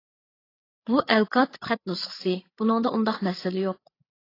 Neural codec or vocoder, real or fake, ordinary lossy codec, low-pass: codec, 16 kHz, 8 kbps, FreqCodec, larger model; fake; AAC, 24 kbps; 5.4 kHz